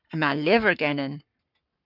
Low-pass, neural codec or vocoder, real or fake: 5.4 kHz; codec, 44.1 kHz, 7.8 kbps, Pupu-Codec; fake